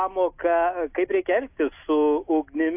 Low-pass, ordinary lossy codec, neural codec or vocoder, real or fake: 3.6 kHz; AAC, 32 kbps; none; real